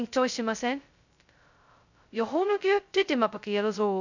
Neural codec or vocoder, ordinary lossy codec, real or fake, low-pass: codec, 16 kHz, 0.2 kbps, FocalCodec; none; fake; 7.2 kHz